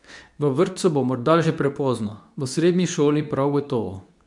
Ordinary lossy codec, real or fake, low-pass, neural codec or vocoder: none; fake; 10.8 kHz; codec, 24 kHz, 0.9 kbps, WavTokenizer, medium speech release version 2